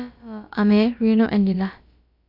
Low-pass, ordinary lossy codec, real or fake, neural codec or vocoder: 5.4 kHz; none; fake; codec, 16 kHz, about 1 kbps, DyCAST, with the encoder's durations